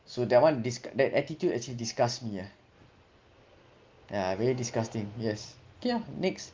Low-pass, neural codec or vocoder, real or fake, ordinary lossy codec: 7.2 kHz; none; real; Opus, 32 kbps